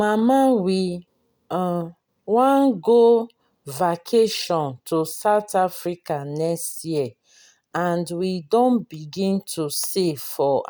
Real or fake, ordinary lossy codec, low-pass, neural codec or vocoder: real; none; none; none